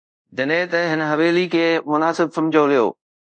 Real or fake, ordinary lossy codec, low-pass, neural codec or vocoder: fake; MP3, 64 kbps; 9.9 kHz; codec, 24 kHz, 0.5 kbps, DualCodec